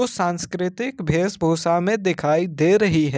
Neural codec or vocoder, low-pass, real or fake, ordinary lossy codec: none; none; real; none